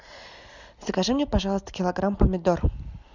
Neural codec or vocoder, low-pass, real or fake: none; 7.2 kHz; real